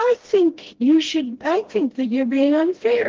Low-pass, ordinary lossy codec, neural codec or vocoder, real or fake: 7.2 kHz; Opus, 16 kbps; codec, 16 kHz, 1 kbps, FreqCodec, smaller model; fake